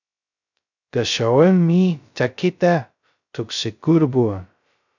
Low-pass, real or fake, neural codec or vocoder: 7.2 kHz; fake; codec, 16 kHz, 0.2 kbps, FocalCodec